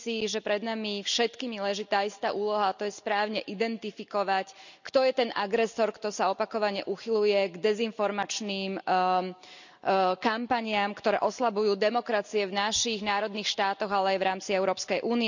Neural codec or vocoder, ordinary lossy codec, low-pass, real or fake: none; none; 7.2 kHz; real